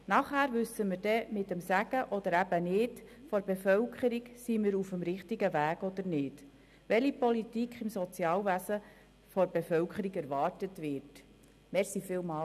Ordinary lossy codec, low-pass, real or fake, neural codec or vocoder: none; 14.4 kHz; real; none